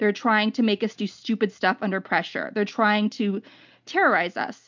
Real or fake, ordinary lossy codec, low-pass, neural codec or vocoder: real; MP3, 64 kbps; 7.2 kHz; none